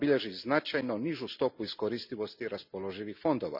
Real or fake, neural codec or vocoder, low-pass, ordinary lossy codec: real; none; 5.4 kHz; none